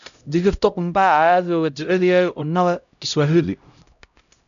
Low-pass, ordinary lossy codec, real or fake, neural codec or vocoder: 7.2 kHz; none; fake; codec, 16 kHz, 0.5 kbps, X-Codec, HuBERT features, trained on LibriSpeech